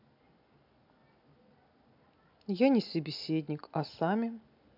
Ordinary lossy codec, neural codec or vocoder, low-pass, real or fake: AAC, 48 kbps; none; 5.4 kHz; real